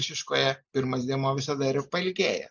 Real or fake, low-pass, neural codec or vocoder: real; 7.2 kHz; none